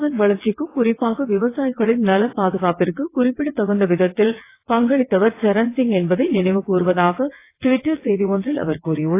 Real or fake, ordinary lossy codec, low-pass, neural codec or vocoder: fake; AAC, 24 kbps; 3.6 kHz; vocoder, 22.05 kHz, 80 mel bands, WaveNeXt